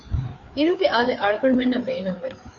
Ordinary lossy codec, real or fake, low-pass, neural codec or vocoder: MP3, 64 kbps; fake; 7.2 kHz; codec, 16 kHz, 4 kbps, FreqCodec, larger model